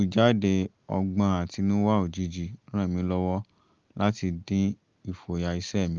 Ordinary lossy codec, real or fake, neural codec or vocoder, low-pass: Opus, 32 kbps; real; none; 7.2 kHz